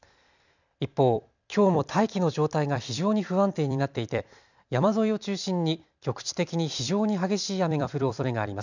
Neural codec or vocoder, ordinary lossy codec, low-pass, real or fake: vocoder, 44.1 kHz, 128 mel bands every 256 samples, BigVGAN v2; none; 7.2 kHz; fake